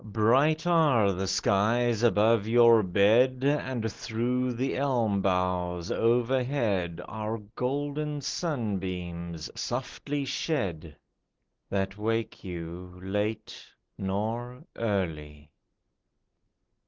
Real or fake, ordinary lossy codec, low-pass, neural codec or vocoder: real; Opus, 16 kbps; 7.2 kHz; none